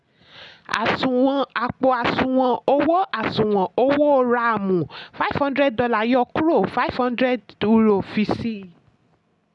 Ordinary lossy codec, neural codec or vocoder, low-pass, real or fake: none; none; 10.8 kHz; real